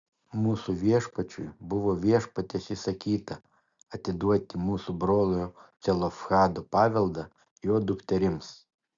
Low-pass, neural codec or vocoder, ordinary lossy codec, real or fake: 7.2 kHz; none; Opus, 64 kbps; real